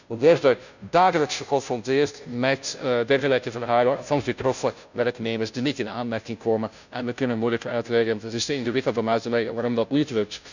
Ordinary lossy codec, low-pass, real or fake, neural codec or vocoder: none; 7.2 kHz; fake; codec, 16 kHz, 0.5 kbps, FunCodec, trained on Chinese and English, 25 frames a second